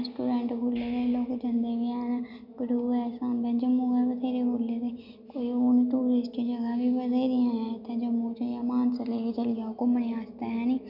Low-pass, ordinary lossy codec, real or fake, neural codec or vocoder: 5.4 kHz; none; real; none